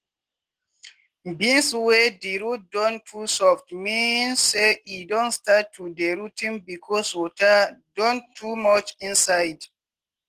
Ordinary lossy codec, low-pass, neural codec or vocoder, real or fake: Opus, 16 kbps; 14.4 kHz; none; real